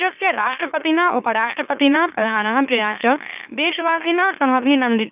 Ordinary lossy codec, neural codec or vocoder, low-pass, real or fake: none; autoencoder, 44.1 kHz, a latent of 192 numbers a frame, MeloTTS; 3.6 kHz; fake